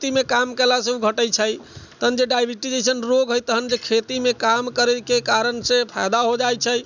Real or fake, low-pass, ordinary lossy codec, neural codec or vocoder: real; 7.2 kHz; none; none